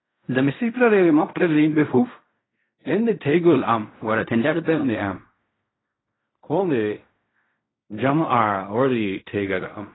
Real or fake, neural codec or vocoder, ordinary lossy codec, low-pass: fake; codec, 16 kHz in and 24 kHz out, 0.4 kbps, LongCat-Audio-Codec, fine tuned four codebook decoder; AAC, 16 kbps; 7.2 kHz